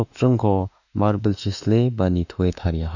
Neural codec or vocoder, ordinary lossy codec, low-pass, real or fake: autoencoder, 48 kHz, 32 numbers a frame, DAC-VAE, trained on Japanese speech; none; 7.2 kHz; fake